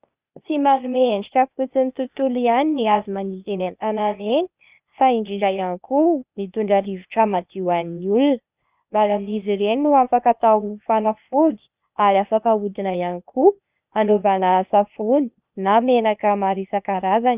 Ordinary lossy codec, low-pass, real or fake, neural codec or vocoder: Opus, 64 kbps; 3.6 kHz; fake; codec, 16 kHz, 0.8 kbps, ZipCodec